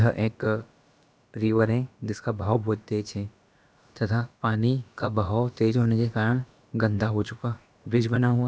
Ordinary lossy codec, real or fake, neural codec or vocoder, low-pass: none; fake; codec, 16 kHz, about 1 kbps, DyCAST, with the encoder's durations; none